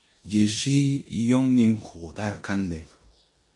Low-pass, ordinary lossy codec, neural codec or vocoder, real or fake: 10.8 kHz; MP3, 48 kbps; codec, 16 kHz in and 24 kHz out, 0.9 kbps, LongCat-Audio-Codec, four codebook decoder; fake